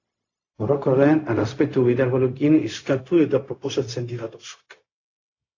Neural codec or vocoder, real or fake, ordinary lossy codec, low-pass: codec, 16 kHz, 0.4 kbps, LongCat-Audio-Codec; fake; AAC, 32 kbps; 7.2 kHz